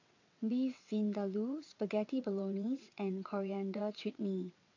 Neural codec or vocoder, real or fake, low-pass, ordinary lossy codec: vocoder, 22.05 kHz, 80 mel bands, WaveNeXt; fake; 7.2 kHz; AAC, 48 kbps